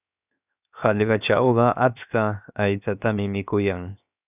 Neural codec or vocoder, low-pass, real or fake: codec, 16 kHz, 0.7 kbps, FocalCodec; 3.6 kHz; fake